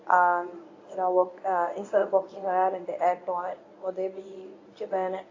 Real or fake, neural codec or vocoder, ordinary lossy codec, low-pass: fake; codec, 24 kHz, 0.9 kbps, WavTokenizer, medium speech release version 1; AAC, 32 kbps; 7.2 kHz